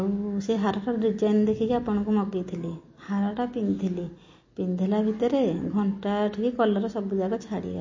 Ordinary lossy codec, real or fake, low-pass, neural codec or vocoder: MP3, 32 kbps; real; 7.2 kHz; none